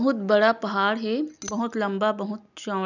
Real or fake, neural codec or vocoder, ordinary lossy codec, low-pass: real; none; none; 7.2 kHz